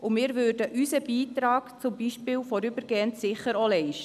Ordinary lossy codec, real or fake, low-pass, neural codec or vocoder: none; real; 14.4 kHz; none